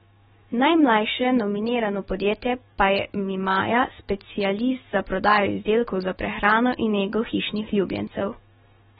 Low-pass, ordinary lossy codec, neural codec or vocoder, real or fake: 19.8 kHz; AAC, 16 kbps; autoencoder, 48 kHz, 128 numbers a frame, DAC-VAE, trained on Japanese speech; fake